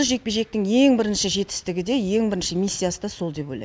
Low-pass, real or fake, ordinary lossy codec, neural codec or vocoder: none; real; none; none